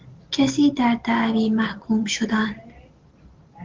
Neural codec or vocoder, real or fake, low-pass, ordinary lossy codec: none; real; 7.2 kHz; Opus, 16 kbps